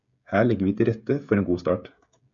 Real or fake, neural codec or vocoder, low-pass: fake; codec, 16 kHz, 16 kbps, FreqCodec, smaller model; 7.2 kHz